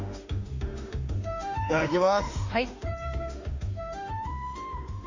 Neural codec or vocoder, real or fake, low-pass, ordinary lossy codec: autoencoder, 48 kHz, 32 numbers a frame, DAC-VAE, trained on Japanese speech; fake; 7.2 kHz; Opus, 64 kbps